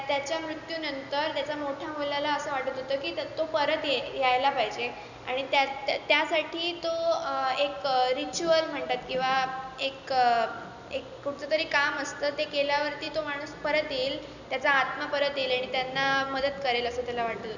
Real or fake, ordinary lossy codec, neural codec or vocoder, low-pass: real; none; none; 7.2 kHz